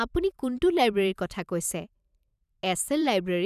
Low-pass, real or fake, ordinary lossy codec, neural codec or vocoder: 14.4 kHz; fake; none; vocoder, 44.1 kHz, 128 mel bands every 512 samples, BigVGAN v2